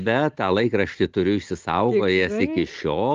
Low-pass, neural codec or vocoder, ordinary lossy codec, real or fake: 7.2 kHz; none; Opus, 32 kbps; real